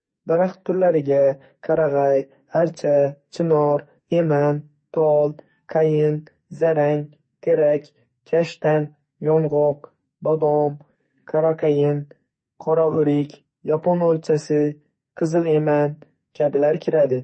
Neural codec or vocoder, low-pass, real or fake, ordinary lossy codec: codec, 44.1 kHz, 2.6 kbps, SNAC; 9.9 kHz; fake; MP3, 32 kbps